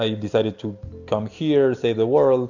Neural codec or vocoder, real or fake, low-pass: none; real; 7.2 kHz